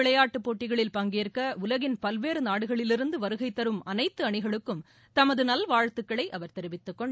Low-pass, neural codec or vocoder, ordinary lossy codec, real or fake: none; none; none; real